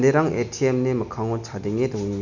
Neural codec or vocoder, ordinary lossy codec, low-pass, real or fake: none; none; 7.2 kHz; real